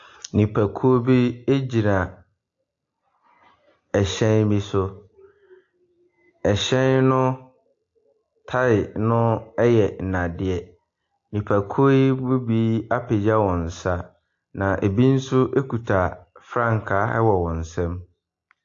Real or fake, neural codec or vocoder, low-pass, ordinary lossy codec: real; none; 7.2 kHz; AAC, 48 kbps